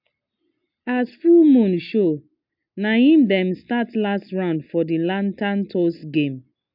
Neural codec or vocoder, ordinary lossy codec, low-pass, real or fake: none; MP3, 48 kbps; 5.4 kHz; real